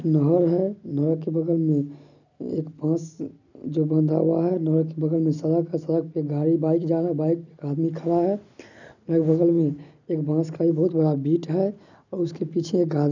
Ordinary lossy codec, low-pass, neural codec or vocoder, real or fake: none; 7.2 kHz; none; real